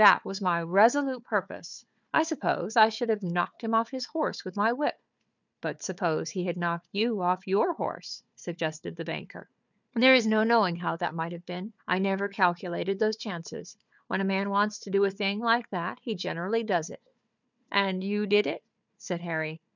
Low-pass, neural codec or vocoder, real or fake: 7.2 kHz; codec, 16 kHz, 4 kbps, FunCodec, trained on Chinese and English, 50 frames a second; fake